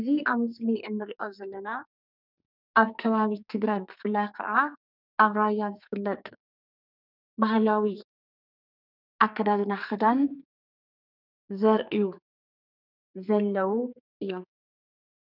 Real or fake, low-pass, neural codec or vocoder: fake; 5.4 kHz; codec, 44.1 kHz, 2.6 kbps, SNAC